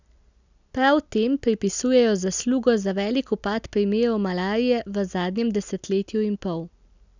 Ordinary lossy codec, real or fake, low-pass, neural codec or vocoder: none; real; 7.2 kHz; none